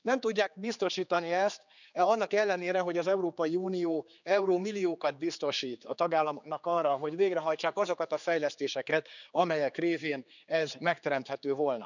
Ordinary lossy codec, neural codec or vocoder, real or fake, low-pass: none; codec, 16 kHz, 4 kbps, X-Codec, HuBERT features, trained on general audio; fake; 7.2 kHz